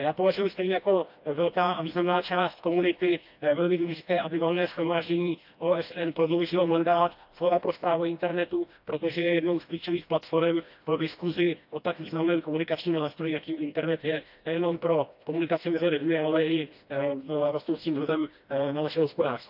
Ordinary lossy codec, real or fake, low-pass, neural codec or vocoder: AAC, 48 kbps; fake; 5.4 kHz; codec, 16 kHz, 1 kbps, FreqCodec, smaller model